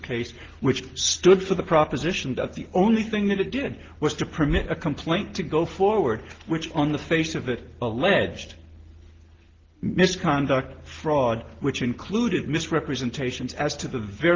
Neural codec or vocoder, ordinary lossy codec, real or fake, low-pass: none; Opus, 16 kbps; real; 7.2 kHz